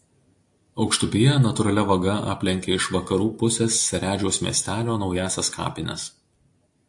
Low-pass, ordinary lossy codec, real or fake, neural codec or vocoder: 10.8 kHz; AAC, 64 kbps; real; none